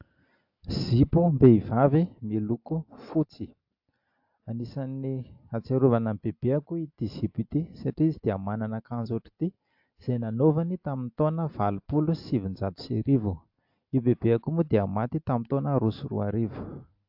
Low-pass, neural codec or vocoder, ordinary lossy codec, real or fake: 5.4 kHz; none; AAC, 48 kbps; real